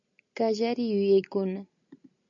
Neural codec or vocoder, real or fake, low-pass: none; real; 7.2 kHz